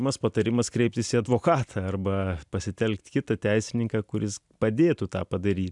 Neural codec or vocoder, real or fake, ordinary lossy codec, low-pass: none; real; MP3, 96 kbps; 10.8 kHz